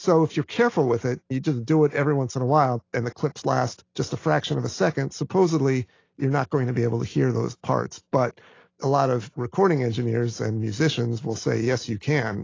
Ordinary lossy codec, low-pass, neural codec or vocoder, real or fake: AAC, 32 kbps; 7.2 kHz; none; real